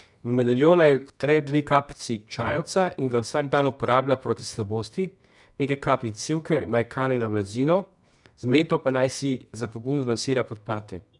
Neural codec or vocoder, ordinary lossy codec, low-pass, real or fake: codec, 24 kHz, 0.9 kbps, WavTokenizer, medium music audio release; none; 10.8 kHz; fake